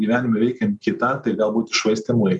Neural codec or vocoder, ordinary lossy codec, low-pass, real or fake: none; MP3, 96 kbps; 10.8 kHz; real